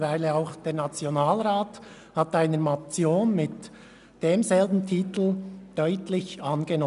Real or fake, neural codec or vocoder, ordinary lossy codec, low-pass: real; none; none; 10.8 kHz